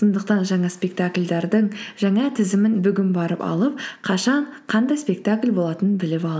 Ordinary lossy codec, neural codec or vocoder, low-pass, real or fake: none; none; none; real